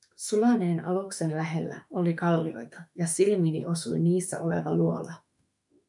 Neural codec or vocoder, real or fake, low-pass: autoencoder, 48 kHz, 32 numbers a frame, DAC-VAE, trained on Japanese speech; fake; 10.8 kHz